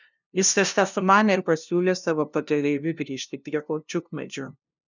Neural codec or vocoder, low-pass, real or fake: codec, 16 kHz, 0.5 kbps, FunCodec, trained on LibriTTS, 25 frames a second; 7.2 kHz; fake